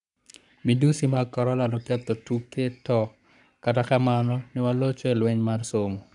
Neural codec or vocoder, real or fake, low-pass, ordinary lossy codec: codec, 44.1 kHz, 7.8 kbps, Pupu-Codec; fake; 10.8 kHz; none